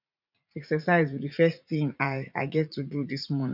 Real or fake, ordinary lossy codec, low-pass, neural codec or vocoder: fake; none; 5.4 kHz; vocoder, 44.1 kHz, 80 mel bands, Vocos